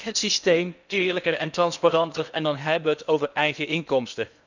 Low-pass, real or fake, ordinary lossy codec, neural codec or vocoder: 7.2 kHz; fake; none; codec, 16 kHz in and 24 kHz out, 0.8 kbps, FocalCodec, streaming, 65536 codes